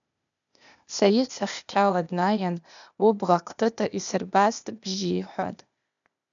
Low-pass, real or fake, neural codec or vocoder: 7.2 kHz; fake; codec, 16 kHz, 0.8 kbps, ZipCodec